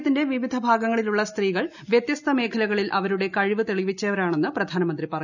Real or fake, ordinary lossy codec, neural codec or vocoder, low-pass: real; none; none; 7.2 kHz